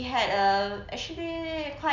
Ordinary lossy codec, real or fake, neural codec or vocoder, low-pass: none; real; none; 7.2 kHz